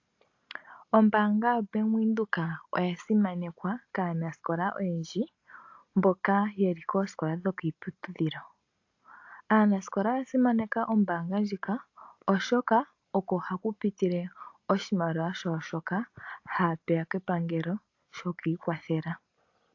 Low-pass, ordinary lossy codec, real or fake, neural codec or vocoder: 7.2 kHz; AAC, 48 kbps; real; none